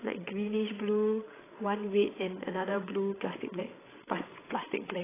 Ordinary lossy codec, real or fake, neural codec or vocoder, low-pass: AAC, 16 kbps; fake; codec, 16 kHz, 16 kbps, FreqCodec, larger model; 3.6 kHz